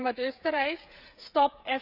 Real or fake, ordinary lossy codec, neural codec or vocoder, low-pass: fake; Opus, 64 kbps; codec, 16 kHz, 8 kbps, FreqCodec, smaller model; 5.4 kHz